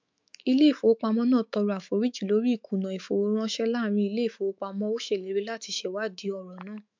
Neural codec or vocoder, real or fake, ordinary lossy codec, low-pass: autoencoder, 48 kHz, 128 numbers a frame, DAC-VAE, trained on Japanese speech; fake; MP3, 64 kbps; 7.2 kHz